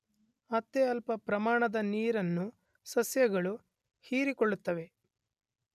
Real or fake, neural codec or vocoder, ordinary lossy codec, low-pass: real; none; none; 14.4 kHz